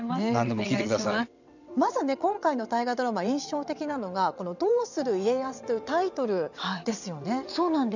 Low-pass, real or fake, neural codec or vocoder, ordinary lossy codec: 7.2 kHz; fake; vocoder, 22.05 kHz, 80 mel bands, WaveNeXt; none